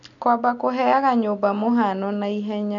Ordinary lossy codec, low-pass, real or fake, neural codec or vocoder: none; 7.2 kHz; real; none